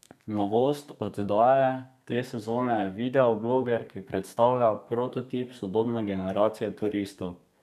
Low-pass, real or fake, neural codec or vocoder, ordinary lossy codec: 14.4 kHz; fake; codec, 32 kHz, 1.9 kbps, SNAC; none